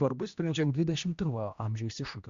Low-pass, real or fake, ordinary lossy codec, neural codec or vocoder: 7.2 kHz; fake; Opus, 64 kbps; codec, 16 kHz, 1 kbps, X-Codec, HuBERT features, trained on general audio